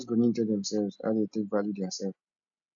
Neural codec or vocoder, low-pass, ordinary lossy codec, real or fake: none; 7.2 kHz; none; real